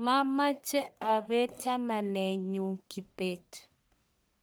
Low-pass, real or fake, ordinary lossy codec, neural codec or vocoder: none; fake; none; codec, 44.1 kHz, 1.7 kbps, Pupu-Codec